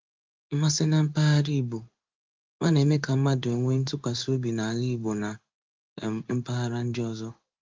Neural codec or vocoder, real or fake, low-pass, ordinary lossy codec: codec, 16 kHz in and 24 kHz out, 1 kbps, XY-Tokenizer; fake; 7.2 kHz; Opus, 32 kbps